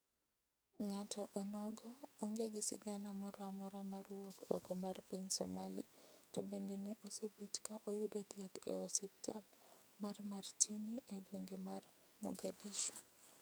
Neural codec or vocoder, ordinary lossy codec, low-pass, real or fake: codec, 44.1 kHz, 2.6 kbps, SNAC; none; none; fake